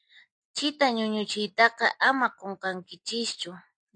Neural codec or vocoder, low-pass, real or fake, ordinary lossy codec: none; 9.9 kHz; real; AAC, 64 kbps